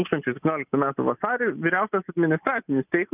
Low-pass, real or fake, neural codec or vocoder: 3.6 kHz; real; none